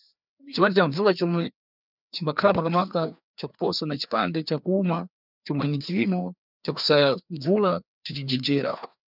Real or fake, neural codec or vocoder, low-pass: fake; codec, 16 kHz, 2 kbps, FreqCodec, larger model; 5.4 kHz